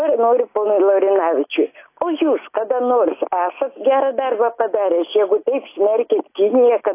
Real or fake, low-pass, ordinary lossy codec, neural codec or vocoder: real; 3.6 kHz; AAC, 24 kbps; none